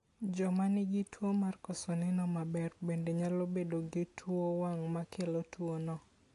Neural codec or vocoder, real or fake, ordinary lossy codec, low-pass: none; real; AAC, 48 kbps; 10.8 kHz